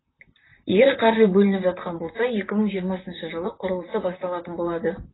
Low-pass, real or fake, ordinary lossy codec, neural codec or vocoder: 7.2 kHz; fake; AAC, 16 kbps; vocoder, 44.1 kHz, 128 mel bands, Pupu-Vocoder